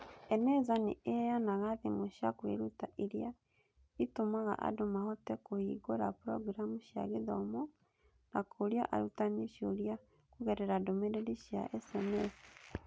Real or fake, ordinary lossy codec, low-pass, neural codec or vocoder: real; none; none; none